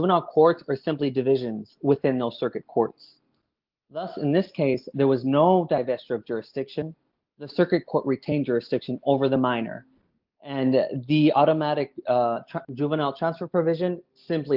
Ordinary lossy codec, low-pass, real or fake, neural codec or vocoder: Opus, 24 kbps; 5.4 kHz; real; none